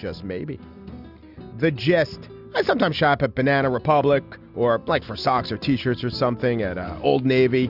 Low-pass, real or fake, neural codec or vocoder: 5.4 kHz; real; none